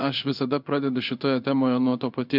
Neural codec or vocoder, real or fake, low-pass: codec, 16 kHz in and 24 kHz out, 1 kbps, XY-Tokenizer; fake; 5.4 kHz